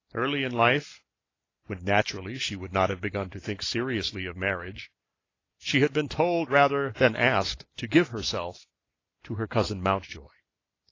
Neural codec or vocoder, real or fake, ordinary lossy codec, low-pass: none; real; AAC, 32 kbps; 7.2 kHz